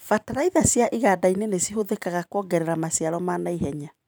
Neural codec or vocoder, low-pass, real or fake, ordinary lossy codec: vocoder, 44.1 kHz, 128 mel bands every 512 samples, BigVGAN v2; none; fake; none